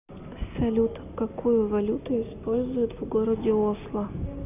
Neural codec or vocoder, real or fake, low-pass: none; real; 3.6 kHz